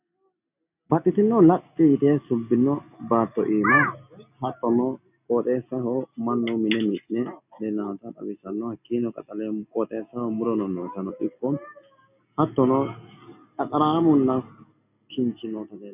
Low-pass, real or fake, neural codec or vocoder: 3.6 kHz; real; none